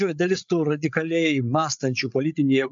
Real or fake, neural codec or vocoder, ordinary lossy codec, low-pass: fake; codec, 16 kHz, 8 kbps, FreqCodec, larger model; MP3, 64 kbps; 7.2 kHz